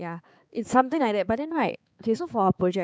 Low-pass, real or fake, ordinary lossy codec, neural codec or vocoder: none; fake; none; codec, 16 kHz, 4 kbps, X-Codec, HuBERT features, trained on balanced general audio